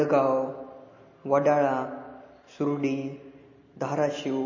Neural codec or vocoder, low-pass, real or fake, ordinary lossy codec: none; 7.2 kHz; real; MP3, 32 kbps